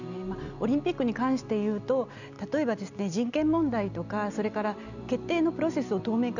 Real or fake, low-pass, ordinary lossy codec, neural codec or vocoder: real; 7.2 kHz; none; none